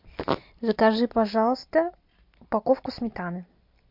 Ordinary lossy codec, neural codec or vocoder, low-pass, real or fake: MP3, 48 kbps; none; 5.4 kHz; real